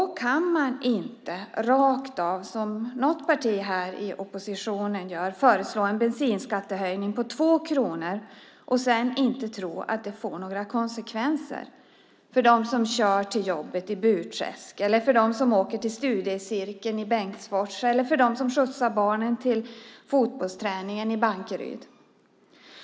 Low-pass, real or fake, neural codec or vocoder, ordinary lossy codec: none; real; none; none